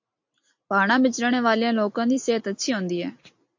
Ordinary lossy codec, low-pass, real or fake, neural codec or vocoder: MP3, 48 kbps; 7.2 kHz; real; none